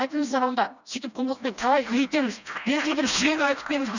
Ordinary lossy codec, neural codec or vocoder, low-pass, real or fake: none; codec, 16 kHz, 1 kbps, FreqCodec, smaller model; 7.2 kHz; fake